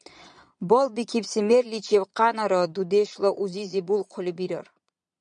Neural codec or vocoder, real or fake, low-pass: vocoder, 22.05 kHz, 80 mel bands, Vocos; fake; 9.9 kHz